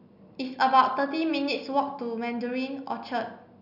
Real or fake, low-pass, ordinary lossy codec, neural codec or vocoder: real; 5.4 kHz; none; none